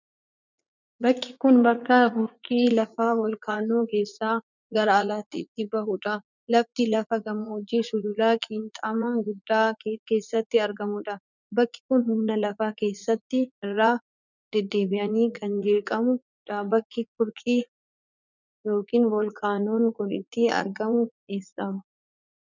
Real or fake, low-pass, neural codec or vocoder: fake; 7.2 kHz; vocoder, 44.1 kHz, 80 mel bands, Vocos